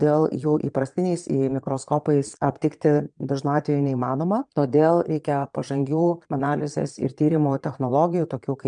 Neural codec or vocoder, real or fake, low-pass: vocoder, 22.05 kHz, 80 mel bands, Vocos; fake; 9.9 kHz